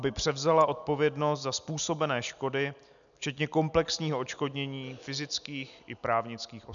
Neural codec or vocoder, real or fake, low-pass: none; real; 7.2 kHz